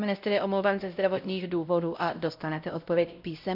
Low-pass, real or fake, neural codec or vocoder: 5.4 kHz; fake; codec, 16 kHz, 0.5 kbps, X-Codec, WavLM features, trained on Multilingual LibriSpeech